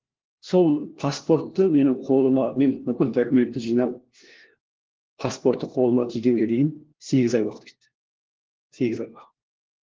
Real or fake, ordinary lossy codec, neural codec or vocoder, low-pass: fake; Opus, 16 kbps; codec, 16 kHz, 1 kbps, FunCodec, trained on LibriTTS, 50 frames a second; 7.2 kHz